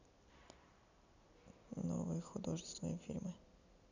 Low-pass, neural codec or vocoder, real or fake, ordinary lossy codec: 7.2 kHz; none; real; none